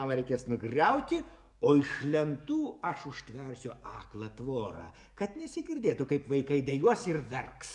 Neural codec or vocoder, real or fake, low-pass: codec, 44.1 kHz, 7.8 kbps, DAC; fake; 10.8 kHz